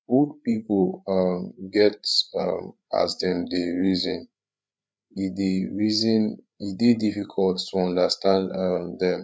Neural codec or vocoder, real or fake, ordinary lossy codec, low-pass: codec, 16 kHz, 8 kbps, FreqCodec, larger model; fake; none; none